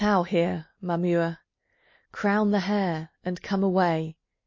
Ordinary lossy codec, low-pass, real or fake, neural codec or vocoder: MP3, 32 kbps; 7.2 kHz; real; none